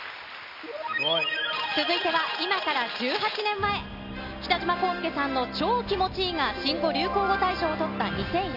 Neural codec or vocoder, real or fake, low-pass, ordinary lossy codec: none; real; 5.4 kHz; none